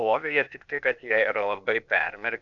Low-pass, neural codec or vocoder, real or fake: 7.2 kHz; codec, 16 kHz, 0.8 kbps, ZipCodec; fake